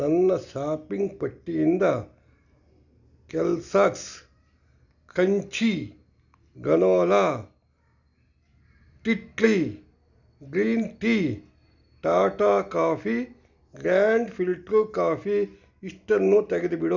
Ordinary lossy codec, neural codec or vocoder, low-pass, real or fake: none; none; 7.2 kHz; real